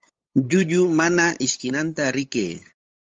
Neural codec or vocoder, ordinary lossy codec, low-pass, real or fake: codec, 16 kHz, 8 kbps, FunCodec, trained on LibriTTS, 25 frames a second; Opus, 32 kbps; 7.2 kHz; fake